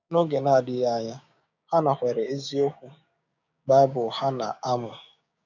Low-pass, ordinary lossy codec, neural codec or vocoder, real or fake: 7.2 kHz; none; codec, 16 kHz, 6 kbps, DAC; fake